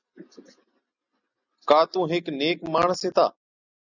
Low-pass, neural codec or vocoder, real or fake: 7.2 kHz; none; real